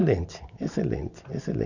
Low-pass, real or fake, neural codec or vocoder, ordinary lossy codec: 7.2 kHz; real; none; none